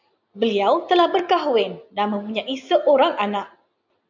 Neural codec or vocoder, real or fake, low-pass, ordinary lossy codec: none; real; 7.2 kHz; MP3, 64 kbps